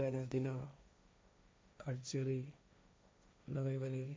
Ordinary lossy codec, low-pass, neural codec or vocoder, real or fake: none; none; codec, 16 kHz, 1.1 kbps, Voila-Tokenizer; fake